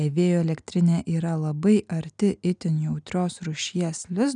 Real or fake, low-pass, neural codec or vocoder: real; 9.9 kHz; none